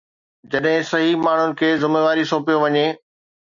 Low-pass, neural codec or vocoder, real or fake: 7.2 kHz; none; real